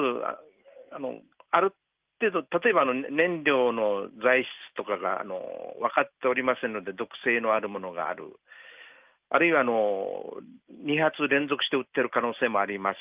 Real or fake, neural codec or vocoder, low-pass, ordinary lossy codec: real; none; 3.6 kHz; Opus, 32 kbps